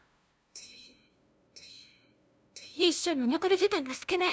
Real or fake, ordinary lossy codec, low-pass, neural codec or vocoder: fake; none; none; codec, 16 kHz, 0.5 kbps, FunCodec, trained on LibriTTS, 25 frames a second